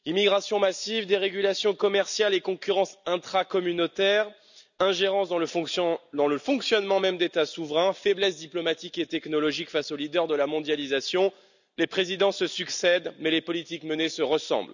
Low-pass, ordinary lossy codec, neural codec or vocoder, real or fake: 7.2 kHz; none; none; real